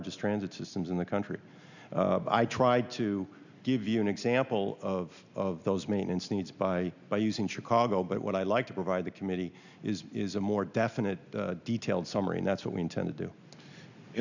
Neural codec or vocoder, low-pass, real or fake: none; 7.2 kHz; real